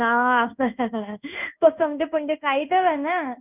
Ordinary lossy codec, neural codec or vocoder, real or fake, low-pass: none; codec, 16 kHz in and 24 kHz out, 1 kbps, XY-Tokenizer; fake; 3.6 kHz